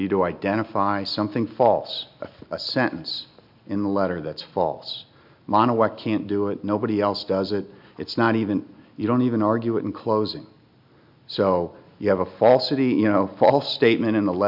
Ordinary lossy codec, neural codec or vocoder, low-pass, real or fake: MP3, 48 kbps; none; 5.4 kHz; real